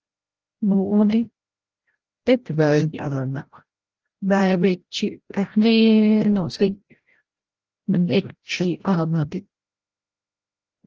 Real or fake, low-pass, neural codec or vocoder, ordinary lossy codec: fake; 7.2 kHz; codec, 16 kHz, 0.5 kbps, FreqCodec, larger model; Opus, 16 kbps